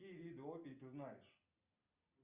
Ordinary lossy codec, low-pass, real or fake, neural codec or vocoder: Opus, 64 kbps; 3.6 kHz; real; none